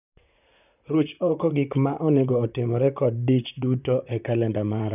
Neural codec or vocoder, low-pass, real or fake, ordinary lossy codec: vocoder, 44.1 kHz, 128 mel bands, Pupu-Vocoder; 3.6 kHz; fake; none